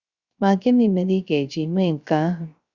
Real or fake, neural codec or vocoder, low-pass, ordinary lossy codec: fake; codec, 16 kHz, 0.3 kbps, FocalCodec; 7.2 kHz; Opus, 64 kbps